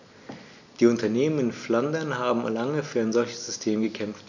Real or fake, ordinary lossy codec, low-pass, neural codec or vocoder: real; none; 7.2 kHz; none